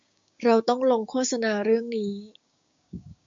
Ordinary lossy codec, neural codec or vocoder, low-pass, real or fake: MP3, 48 kbps; codec, 16 kHz, 6 kbps, DAC; 7.2 kHz; fake